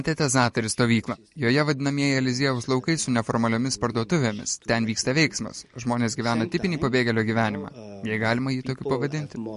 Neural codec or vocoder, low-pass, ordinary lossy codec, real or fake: none; 14.4 kHz; MP3, 48 kbps; real